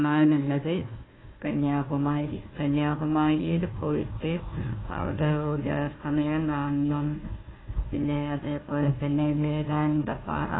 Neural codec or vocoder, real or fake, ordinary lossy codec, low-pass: codec, 16 kHz, 1 kbps, FunCodec, trained on Chinese and English, 50 frames a second; fake; AAC, 16 kbps; 7.2 kHz